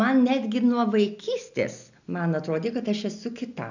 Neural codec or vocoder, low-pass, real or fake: none; 7.2 kHz; real